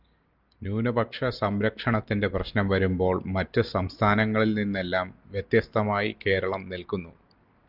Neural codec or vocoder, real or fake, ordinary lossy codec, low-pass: none; real; Opus, 24 kbps; 5.4 kHz